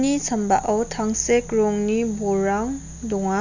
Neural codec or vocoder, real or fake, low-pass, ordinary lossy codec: none; real; 7.2 kHz; none